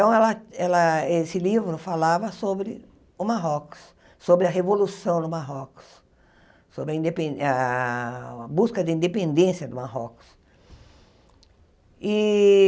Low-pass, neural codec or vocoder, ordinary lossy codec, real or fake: none; none; none; real